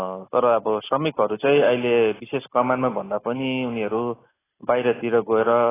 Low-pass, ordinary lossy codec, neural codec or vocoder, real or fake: 3.6 kHz; AAC, 16 kbps; none; real